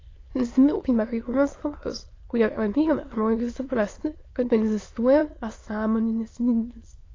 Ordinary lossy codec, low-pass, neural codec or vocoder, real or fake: AAC, 32 kbps; 7.2 kHz; autoencoder, 22.05 kHz, a latent of 192 numbers a frame, VITS, trained on many speakers; fake